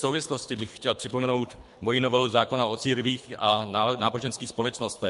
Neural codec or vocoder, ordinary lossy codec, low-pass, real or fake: codec, 24 kHz, 3 kbps, HILCodec; MP3, 64 kbps; 10.8 kHz; fake